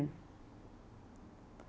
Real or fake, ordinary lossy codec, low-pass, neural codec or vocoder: real; none; none; none